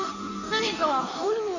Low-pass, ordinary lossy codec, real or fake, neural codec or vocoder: 7.2 kHz; none; fake; codec, 16 kHz in and 24 kHz out, 1.1 kbps, FireRedTTS-2 codec